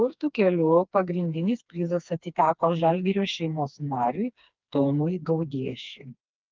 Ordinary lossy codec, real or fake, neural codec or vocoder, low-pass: Opus, 24 kbps; fake; codec, 16 kHz, 2 kbps, FreqCodec, smaller model; 7.2 kHz